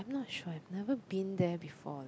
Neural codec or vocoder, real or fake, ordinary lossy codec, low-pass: none; real; none; none